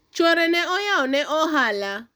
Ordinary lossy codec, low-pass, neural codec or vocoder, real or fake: none; none; none; real